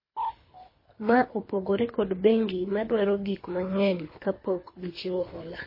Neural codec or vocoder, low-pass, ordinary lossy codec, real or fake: codec, 24 kHz, 3 kbps, HILCodec; 5.4 kHz; MP3, 24 kbps; fake